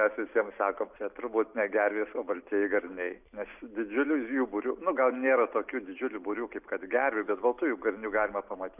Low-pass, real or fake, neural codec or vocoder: 3.6 kHz; real; none